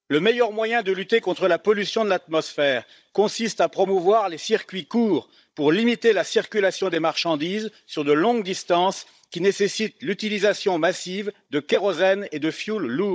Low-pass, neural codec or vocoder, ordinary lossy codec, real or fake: none; codec, 16 kHz, 16 kbps, FunCodec, trained on Chinese and English, 50 frames a second; none; fake